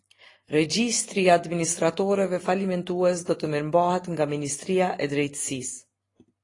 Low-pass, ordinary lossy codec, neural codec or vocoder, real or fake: 10.8 kHz; AAC, 32 kbps; none; real